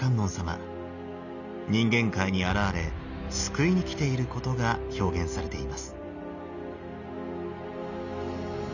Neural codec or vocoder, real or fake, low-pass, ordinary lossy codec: none; real; 7.2 kHz; none